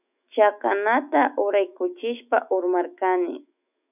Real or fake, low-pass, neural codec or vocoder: fake; 3.6 kHz; autoencoder, 48 kHz, 128 numbers a frame, DAC-VAE, trained on Japanese speech